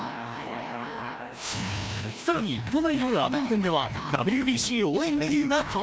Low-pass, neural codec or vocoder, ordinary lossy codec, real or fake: none; codec, 16 kHz, 1 kbps, FreqCodec, larger model; none; fake